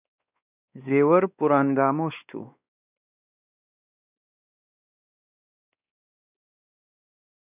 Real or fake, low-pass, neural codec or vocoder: fake; 3.6 kHz; codec, 16 kHz, 2 kbps, X-Codec, WavLM features, trained on Multilingual LibriSpeech